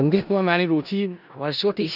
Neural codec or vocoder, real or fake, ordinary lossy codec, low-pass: codec, 16 kHz in and 24 kHz out, 0.4 kbps, LongCat-Audio-Codec, four codebook decoder; fake; none; 5.4 kHz